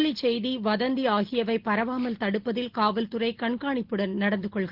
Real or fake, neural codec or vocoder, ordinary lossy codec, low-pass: real; none; Opus, 16 kbps; 5.4 kHz